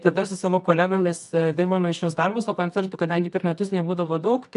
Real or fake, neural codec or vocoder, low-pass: fake; codec, 24 kHz, 0.9 kbps, WavTokenizer, medium music audio release; 10.8 kHz